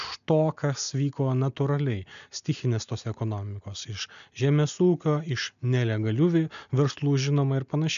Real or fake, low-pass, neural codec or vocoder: real; 7.2 kHz; none